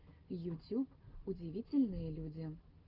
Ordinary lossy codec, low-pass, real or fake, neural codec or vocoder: Opus, 24 kbps; 5.4 kHz; real; none